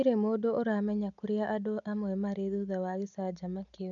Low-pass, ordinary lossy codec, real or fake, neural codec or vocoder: 7.2 kHz; none; real; none